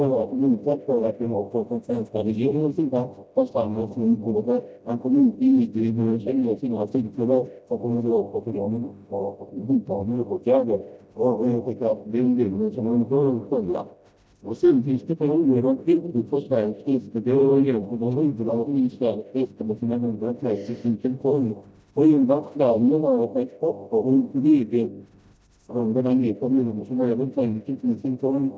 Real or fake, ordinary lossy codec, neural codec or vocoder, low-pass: fake; none; codec, 16 kHz, 0.5 kbps, FreqCodec, smaller model; none